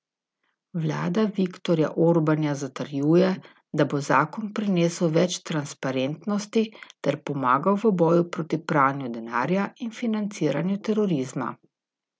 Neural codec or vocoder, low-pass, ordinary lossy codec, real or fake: none; none; none; real